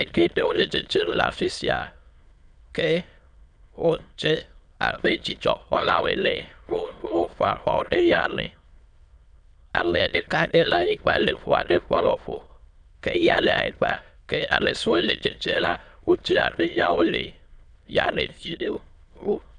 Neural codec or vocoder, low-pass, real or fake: autoencoder, 22.05 kHz, a latent of 192 numbers a frame, VITS, trained on many speakers; 9.9 kHz; fake